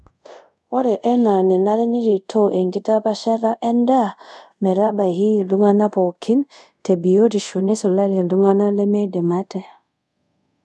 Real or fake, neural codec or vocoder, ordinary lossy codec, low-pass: fake; codec, 24 kHz, 0.5 kbps, DualCodec; none; none